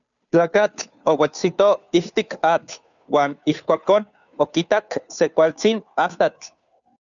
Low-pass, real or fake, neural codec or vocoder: 7.2 kHz; fake; codec, 16 kHz, 2 kbps, FunCodec, trained on Chinese and English, 25 frames a second